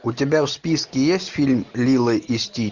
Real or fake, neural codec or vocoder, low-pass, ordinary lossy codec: real; none; 7.2 kHz; Opus, 64 kbps